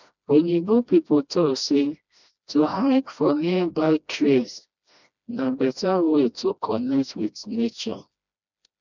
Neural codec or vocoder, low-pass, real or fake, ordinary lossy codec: codec, 16 kHz, 1 kbps, FreqCodec, smaller model; 7.2 kHz; fake; none